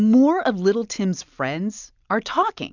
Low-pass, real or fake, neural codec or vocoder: 7.2 kHz; real; none